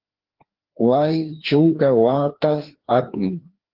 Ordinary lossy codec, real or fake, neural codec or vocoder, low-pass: Opus, 16 kbps; fake; codec, 16 kHz, 2 kbps, FreqCodec, larger model; 5.4 kHz